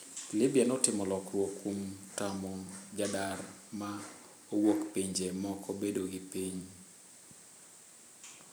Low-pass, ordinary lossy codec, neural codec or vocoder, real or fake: none; none; vocoder, 44.1 kHz, 128 mel bands every 512 samples, BigVGAN v2; fake